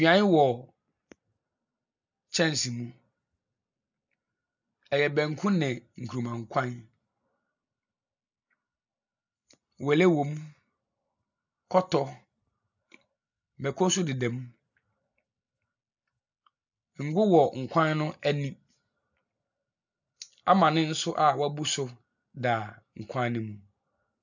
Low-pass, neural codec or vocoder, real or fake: 7.2 kHz; none; real